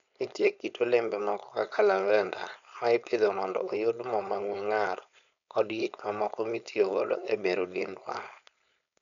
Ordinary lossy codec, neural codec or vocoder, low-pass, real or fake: none; codec, 16 kHz, 4.8 kbps, FACodec; 7.2 kHz; fake